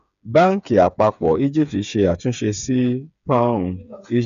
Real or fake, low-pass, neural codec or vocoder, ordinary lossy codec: fake; 7.2 kHz; codec, 16 kHz, 8 kbps, FreqCodec, smaller model; none